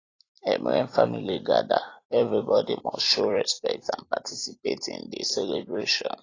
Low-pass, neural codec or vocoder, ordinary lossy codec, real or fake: 7.2 kHz; vocoder, 44.1 kHz, 128 mel bands every 256 samples, BigVGAN v2; AAC, 32 kbps; fake